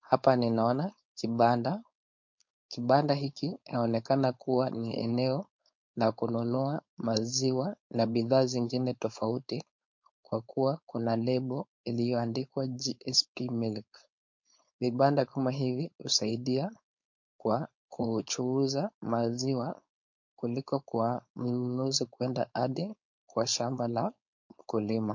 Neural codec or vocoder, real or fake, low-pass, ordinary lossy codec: codec, 16 kHz, 4.8 kbps, FACodec; fake; 7.2 kHz; MP3, 48 kbps